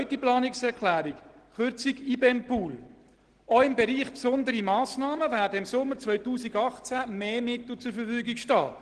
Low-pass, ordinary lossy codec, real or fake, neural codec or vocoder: 9.9 kHz; Opus, 16 kbps; real; none